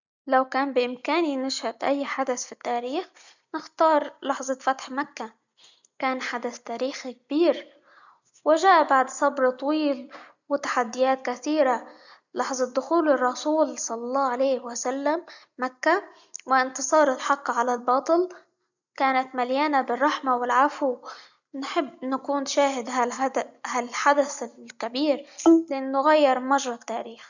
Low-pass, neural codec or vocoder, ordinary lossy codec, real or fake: 7.2 kHz; none; none; real